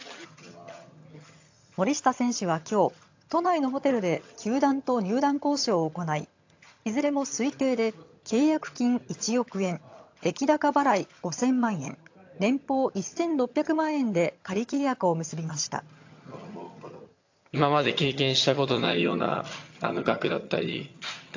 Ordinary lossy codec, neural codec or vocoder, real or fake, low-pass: AAC, 48 kbps; vocoder, 22.05 kHz, 80 mel bands, HiFi-GAN; fake; 7.2 kHz